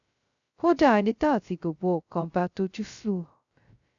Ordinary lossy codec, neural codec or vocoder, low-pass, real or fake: none; codec, 16 kHz, 0.2 kbps, FocalCodec; 7.2 kHz; fake